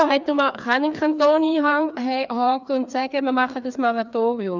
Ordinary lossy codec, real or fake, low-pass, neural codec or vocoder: none; fake; 7.2 kHz; codec, 16 kHz, 2 kbps, FreqCodec, larger model